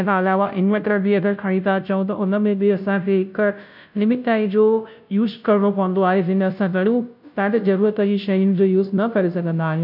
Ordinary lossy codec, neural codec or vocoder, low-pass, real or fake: none; codec, 16 kHz, 0.5 kbps, FunCodec, trained on Chinese and English, 25 frames a second; 5.4 kHz; fake